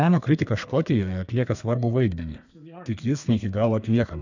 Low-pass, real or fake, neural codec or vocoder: 7.2 kHz; fake; codec, 32 kHz, 1.9 kbps, SNAC